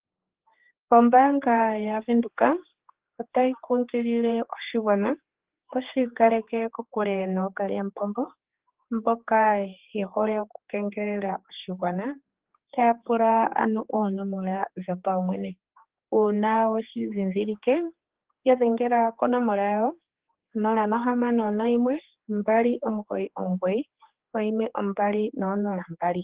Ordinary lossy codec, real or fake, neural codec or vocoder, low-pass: Opus, 16 kbps; fake; codec, 16 kHz, 4 kbps, X-Codec, HuBERT features, trained on general audio; 3.6 kHz